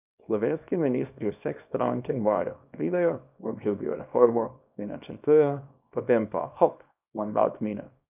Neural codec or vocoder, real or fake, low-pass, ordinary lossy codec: codec, 24 kHz, 0.9 kbps, WavTokenizer, small release; fake; 3.6 kHz; none